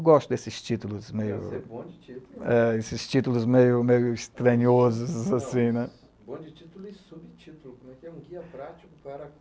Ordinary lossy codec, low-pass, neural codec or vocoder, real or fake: none; none; none; real